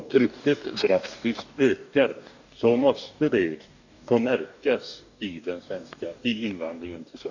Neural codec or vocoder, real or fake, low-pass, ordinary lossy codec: codec, 44.1 kHz, 2.6 kbps, DAC; fake; 7.2 kHz; none